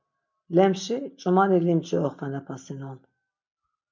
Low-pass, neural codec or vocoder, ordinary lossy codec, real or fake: 7.2 kHz; none; MP3, 64 kbps; real